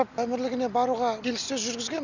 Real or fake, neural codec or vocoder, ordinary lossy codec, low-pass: real; none; none; 7.2 kHz